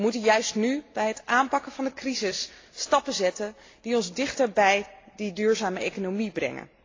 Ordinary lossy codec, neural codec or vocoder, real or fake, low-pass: AAC, 32 kbps; none; real; 7.2 kHz